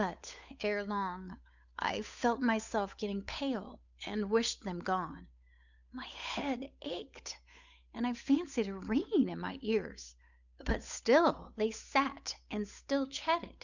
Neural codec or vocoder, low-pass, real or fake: codec, 16 kHz, 8 kbps, FunCodec, trained on Chinese and English, 25 frames a second; 7.2 kHz; fake